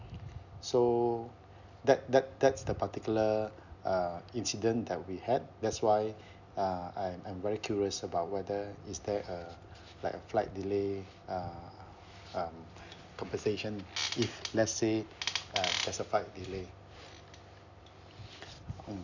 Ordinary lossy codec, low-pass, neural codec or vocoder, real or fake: none; 7.2 kHz; none; real